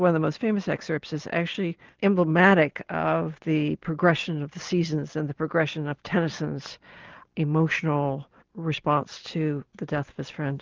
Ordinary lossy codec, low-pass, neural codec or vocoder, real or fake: Opus, 24 kbps; 7.2 kHz; none; real